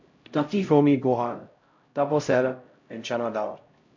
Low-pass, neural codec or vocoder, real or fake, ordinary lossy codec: 7.2 kHz; codec, 16 kHz, 0.5 kbps, X-Codec, HuBERT features, trained on LibriSpeech; fake; MP3, 48 kbps